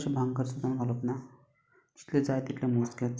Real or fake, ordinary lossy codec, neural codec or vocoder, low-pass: real; none; none; none